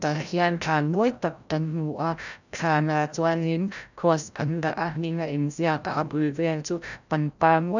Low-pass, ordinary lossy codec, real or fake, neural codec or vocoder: 7.2 kHz; none; fake; codec, 16 kHz, 0.5 kbps, FreqCodec, larger model